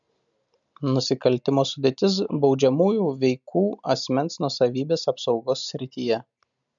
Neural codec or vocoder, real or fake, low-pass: none; real; 7.2 kHz